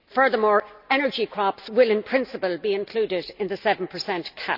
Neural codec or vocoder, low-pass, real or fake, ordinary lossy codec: none; 5.4 kHz; real; none